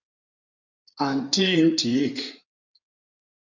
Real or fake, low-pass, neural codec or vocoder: fake; 7.2 kHz; codec, 16 kHz in and 24 kHz out, 2.2 kbps, FireRedTTS-2 codec